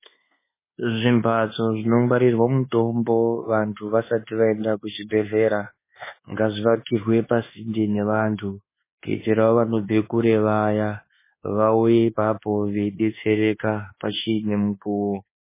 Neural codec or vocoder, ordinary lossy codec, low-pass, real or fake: codec, 24 kHz, 1.2 kbps, DualCodec; MP3, 16 kbps; 3.6 kHz; fake